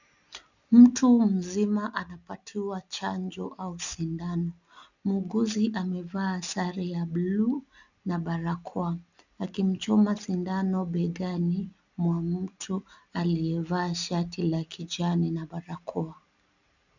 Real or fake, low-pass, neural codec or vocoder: real; 7.2 kHz; none